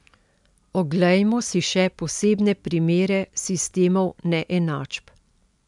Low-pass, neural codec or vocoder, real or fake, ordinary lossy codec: 10.8 kHz; none; real; MP3, 96 kbps